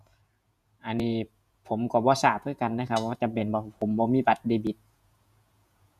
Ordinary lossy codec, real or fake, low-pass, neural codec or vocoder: none; fake; 14.4 kHz; vocoder, 48 kHz, 128 mel bands, Vocos